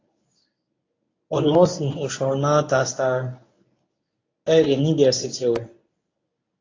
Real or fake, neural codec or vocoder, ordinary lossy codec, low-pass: fake; codec, 24 kHz, 0.9 kbps, WavTokenizer, medium speech release version 1; AAC, 32 kbps; 7.2 kHz